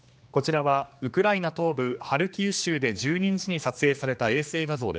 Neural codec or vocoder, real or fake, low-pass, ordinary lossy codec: codec, 16 kHz, 2 kbps, X-Codec, HuBERT features, trained on general audio; fake; none; none